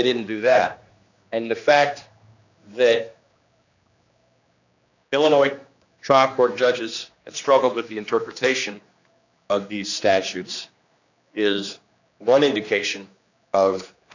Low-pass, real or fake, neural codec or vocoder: 7.2 kHz; fake; codec, 16 kHz, 2 kbps, X-Codec, HuBERT features, trained on general audio